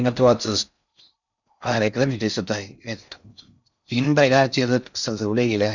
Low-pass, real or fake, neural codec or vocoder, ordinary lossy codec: 7.2 kHz; fake; codec, 16 kHz in and 24 kHz out, 0.6 kbps, FocalCodec, streaming, 4096 codes; none